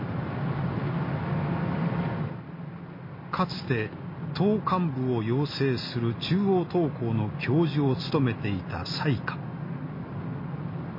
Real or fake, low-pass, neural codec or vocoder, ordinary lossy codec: real; 5.4 kHz; none; MP3, 24 kbps